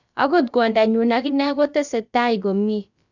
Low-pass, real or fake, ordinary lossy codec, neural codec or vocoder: 7.2 kHz; fake; none; codec, 16 kHz, about 1 kbps, DyCAST, with the encoder's durations